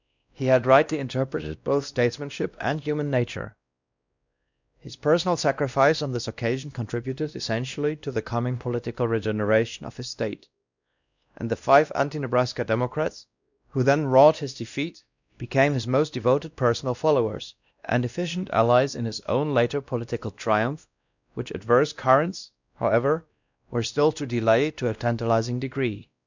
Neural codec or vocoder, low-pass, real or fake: codec, 16 kHz, 1 kbps, X-Codec, WavLM features, trained on Multilingual LibriSpeech; 7.2 kHz; fake